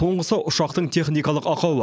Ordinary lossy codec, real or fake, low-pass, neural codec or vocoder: none; real; none; none